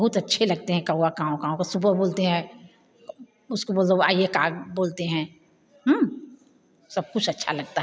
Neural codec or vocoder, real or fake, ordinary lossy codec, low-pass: none; real; none; none